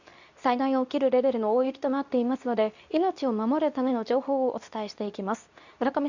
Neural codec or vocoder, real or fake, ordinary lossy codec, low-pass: codec, 24 kHz, 0.9 kbps, WavTokenizer, medium speech release version 2; fake; none; 7.2 kHz